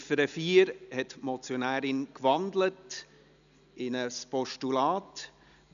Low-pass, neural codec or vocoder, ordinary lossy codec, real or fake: 7.2 kHz; none; none; real